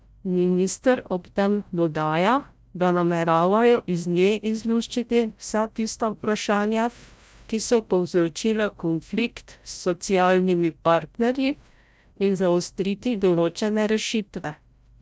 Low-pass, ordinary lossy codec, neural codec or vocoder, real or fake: none; none; codec, 16 kHz, 0.5 kbps, FreqCodec, larger model; fake